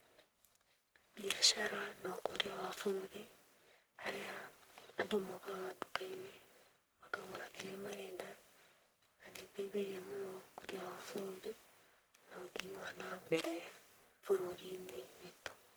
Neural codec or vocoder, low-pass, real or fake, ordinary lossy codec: codec, 44.1 kHz, 1.7 kbps, Pupu-Codec; none; fake; none